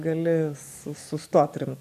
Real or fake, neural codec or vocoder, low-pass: fake; codec, 44.1 kHz, 7.8 kbps, DAC; 14.4 kHz